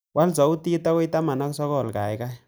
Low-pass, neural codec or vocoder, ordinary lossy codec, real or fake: none; none; none; real